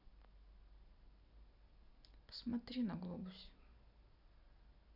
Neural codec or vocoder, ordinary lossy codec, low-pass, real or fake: none; none; 5.4 kHz; real